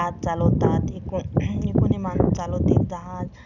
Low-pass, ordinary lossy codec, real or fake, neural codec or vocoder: 7.2 kHz; none; real; none